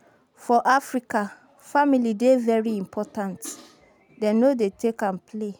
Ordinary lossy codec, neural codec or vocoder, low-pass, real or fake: none; none; none; real